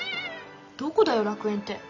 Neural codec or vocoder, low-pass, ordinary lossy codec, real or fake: none; 7.2 kHz; none; real